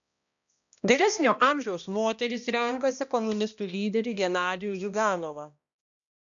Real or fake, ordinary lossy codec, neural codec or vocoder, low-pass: fake; MP3, 96 kbps; codec, 16 kHz, 1 kbps, X-Codec, HuBERT features, trained on balanced general audio; 7.2 kHz